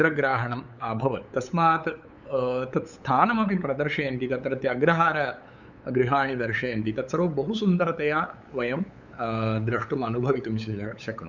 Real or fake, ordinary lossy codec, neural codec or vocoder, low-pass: fake; none; codec, 16 kHz, 8 kbps, FunCodec, trained on LibriTTS, 25 frames a second; 7.2 kHz